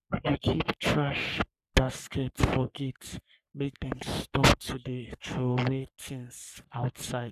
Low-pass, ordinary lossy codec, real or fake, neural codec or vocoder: 14.4 kHz; none; fake; codec, 44.1 kHz, 3.4 kbps, Pupu-Codec